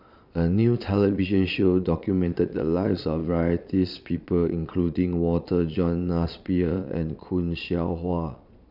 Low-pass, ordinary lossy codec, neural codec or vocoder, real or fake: 5.4 kHz; none; vocoder, 22.05 kHz, 80 mel bands, Vocos; fake